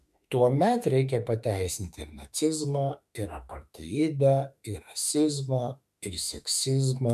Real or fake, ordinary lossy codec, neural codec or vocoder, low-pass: fake; MP3, 96 kbps; autoencoder, 48 kHz, 32 numbers a frame, DAC-VAE, trained on Japanese speech; 14.4 kHz